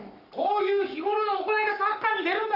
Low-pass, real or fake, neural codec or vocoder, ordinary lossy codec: 5.4 kHz; fake; codec, 44.1 kHz, 7.8 kbps, Pupu-Codec; none